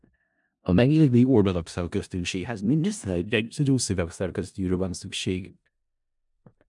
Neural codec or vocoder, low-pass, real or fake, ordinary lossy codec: codec, 16 kHz in and 24 kHz out, 0.4 kbps, LongCat-Audio-Codec, four codebook decoder; 10.8 kHz; fake; MP3, 96 kbps